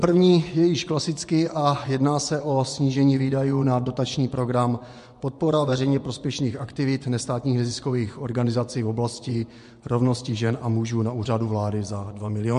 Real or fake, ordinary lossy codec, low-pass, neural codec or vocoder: fake; MP3, 64 kbps; 10.8 kHz; vocoder, 24 kHz, 100 mel bands, Vocos